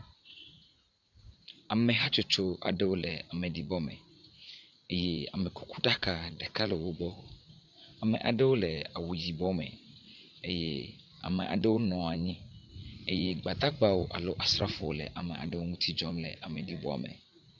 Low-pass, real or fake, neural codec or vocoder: 7.2 kHz; fake; vocoder, 44.1 kHz, 80 mel bands, Vocos